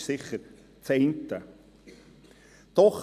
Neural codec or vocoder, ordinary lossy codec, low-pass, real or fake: none; MP3, 96 kbps; 14.4 kHz; real